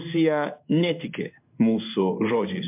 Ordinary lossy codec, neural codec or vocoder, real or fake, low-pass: MP3, 32 kbps; codec, 16 kHz in and 24 kHz out, 1 kbps, XY-Tokenizer; fake; 3.6 kHz